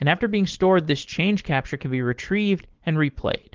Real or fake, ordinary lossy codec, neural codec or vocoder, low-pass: fake; Opus, 16 kbps; codec, 16 kHz, 4.8 kbps, FACodec; 7.2 kHz